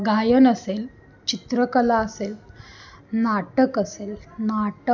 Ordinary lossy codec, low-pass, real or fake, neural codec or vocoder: none; 7.2 kHz; real; none